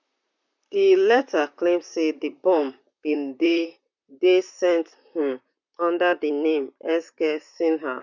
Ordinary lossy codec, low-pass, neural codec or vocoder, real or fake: none; 7.2 kHz; vocoder, 44.1 kHz, 128 mel bands, Pupu-Vocoder; fake